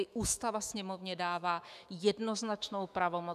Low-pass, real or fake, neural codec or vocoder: 14.4 kHz; fake; autoencoder, 48 kHz, 128 numbers a frame, DAC-VAE, trained on Japanese speech